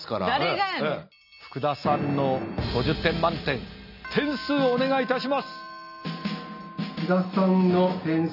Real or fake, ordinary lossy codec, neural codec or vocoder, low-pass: real; none; none; 5.4 kHz